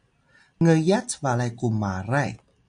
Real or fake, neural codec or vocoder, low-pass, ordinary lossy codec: real; none; 9.9 kHz; AAC, 64 kbps